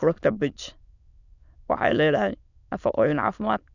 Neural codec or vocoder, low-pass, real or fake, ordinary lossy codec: autoencoder, 22.05 kHz, a latent of 192 numbers a frame, VITS, trained on many speakers; 7.2 kHz; fake; none